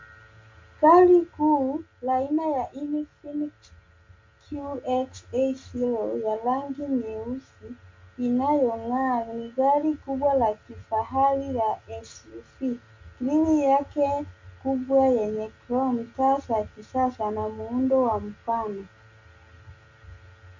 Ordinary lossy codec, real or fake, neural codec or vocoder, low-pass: MP3, 64 kbps; real; none; 7.2 kHz